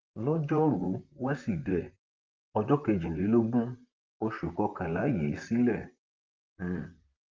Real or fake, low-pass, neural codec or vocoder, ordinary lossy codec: fake; 7.2 kHz; vocoder, 44.1 kHz, 128 mel bands, Pupu-Vocoder; Opus, 24 kbps